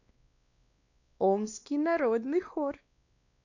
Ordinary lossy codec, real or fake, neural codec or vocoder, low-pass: none; fake; codec, 16 kHz, 2 kbps, X-Codec, WavLM features, trained on Multilingual LibriSpeech; 7.2 kHz